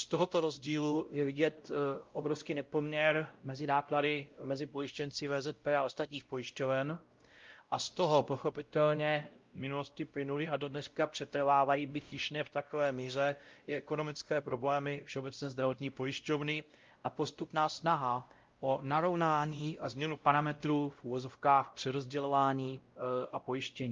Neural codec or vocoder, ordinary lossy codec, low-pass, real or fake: codec, 16 kHz, 0.5 kbps, X-Codec, WavLM features, trained on Multilingual LibriSpeech; Opus, 32 kbps; 7.2 kHz; fake